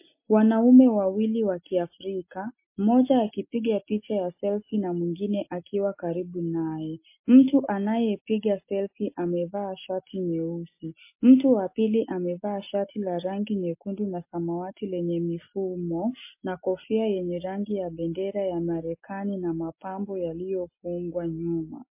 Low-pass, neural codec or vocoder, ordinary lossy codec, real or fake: 3.6 kHz; none; MP3, 24 kbps; real